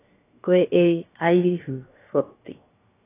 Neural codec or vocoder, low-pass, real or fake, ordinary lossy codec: codec, 16 kHz, 0.7 kbps, FocalCodec; 3.6 kHz; fake; AAC, 16 kbps